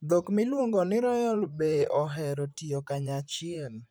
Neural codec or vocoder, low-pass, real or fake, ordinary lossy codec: vocoder, 44.1 kHz, 128 mel bands, Pupu-Vocoder; none; fake; none